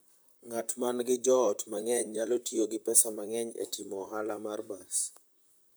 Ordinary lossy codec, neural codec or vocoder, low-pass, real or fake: none; vocoder, 44.1 kHz, 128 mel bands, Pupu-Vocoder; none; fake